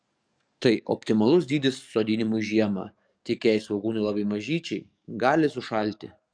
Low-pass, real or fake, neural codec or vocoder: 9.9 kHz; fake; codec, 44.1 kHz, 7.8 kbps, DAC